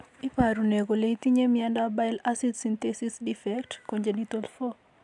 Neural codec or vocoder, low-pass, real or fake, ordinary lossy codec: none; 10.8 kHz; real; none